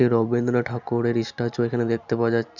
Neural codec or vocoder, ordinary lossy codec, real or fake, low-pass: none; none; real; 7.2 kHz